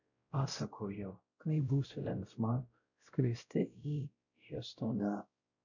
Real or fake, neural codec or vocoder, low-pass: fake; codec, 16 kHz, 0.5 kbps, X-Codec, WavLM features, trained on Multilingual LibriSpeech; 7.2 kHz